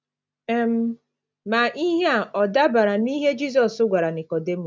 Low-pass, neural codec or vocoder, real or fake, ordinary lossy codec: none; none; real; none